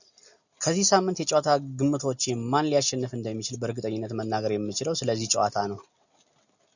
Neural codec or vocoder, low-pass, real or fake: none; 7.2 kHz; real